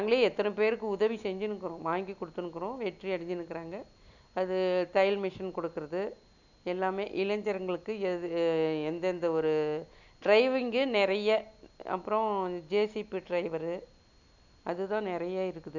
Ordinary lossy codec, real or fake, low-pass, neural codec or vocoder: none; real; 7.2 kHz; none